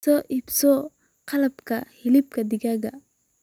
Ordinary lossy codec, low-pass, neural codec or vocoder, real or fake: none; 19.8 kHz; none; real